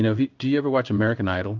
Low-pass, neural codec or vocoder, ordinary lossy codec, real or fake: 7.2 kHz; codec, 16 kHz in and 24 kHz out, 1 kbps, XY-Tokenizer; Opus, 24 kbps; fake